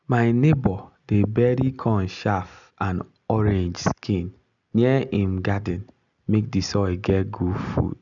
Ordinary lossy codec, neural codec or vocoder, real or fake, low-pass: none; none; real; 7.2 kHz